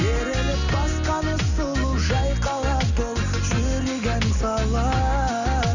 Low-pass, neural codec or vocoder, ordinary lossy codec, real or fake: 7.2 kHz; none; none; real